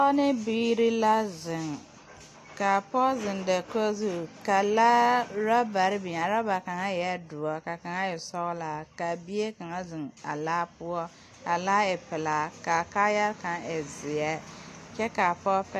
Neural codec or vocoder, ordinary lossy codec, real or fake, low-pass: none; AAC, 48 kbps; real; 14.4 kHz